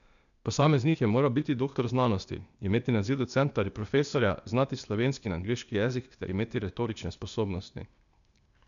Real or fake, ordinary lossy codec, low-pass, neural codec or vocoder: fake; none; 7.2 kHz; codec, 16 kHz, 0.8 kbps, ZipCodec